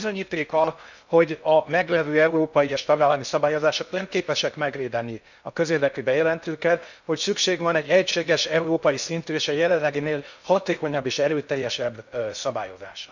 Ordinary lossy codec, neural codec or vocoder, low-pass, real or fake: none; codec, 16 kHz in and 24 kHz out, 0.6 kbps, FocalCodec, streaming, 2048 codes; 7.2 kHz; fake